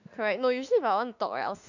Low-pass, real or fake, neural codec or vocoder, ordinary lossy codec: 7.2 kHz; fake; autoencoder, 48 kHz, 32 numbers a frame, DAC-VAE, trained on Japanese speech; none